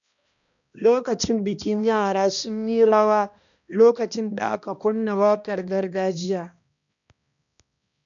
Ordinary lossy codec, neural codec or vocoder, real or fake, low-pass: none; codec, 16 kHz, 1 kbps, X-Codec, HuBERT features, trained on balanced general audio; fake; 7.2 kHz